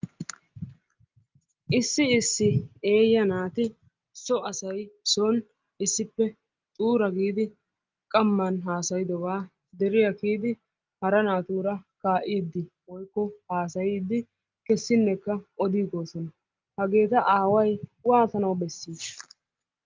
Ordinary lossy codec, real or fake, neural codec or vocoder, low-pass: Opus, 24 kbps; real; none; 7.2 kHz